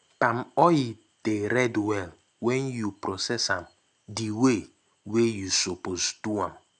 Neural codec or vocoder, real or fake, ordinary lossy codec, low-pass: none; real; none; 9.9 kHz